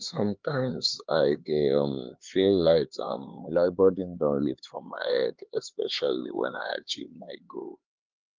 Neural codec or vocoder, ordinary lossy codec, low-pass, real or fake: codec, 16 kHz, 4 kbps, X-Codec, HuBERT features, trained on LibriSpeech; Opus, 32 kbps; 7.2 kHz; fake